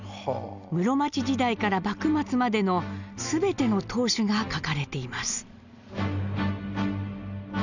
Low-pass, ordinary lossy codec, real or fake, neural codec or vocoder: 7.2 kHz; none; real; none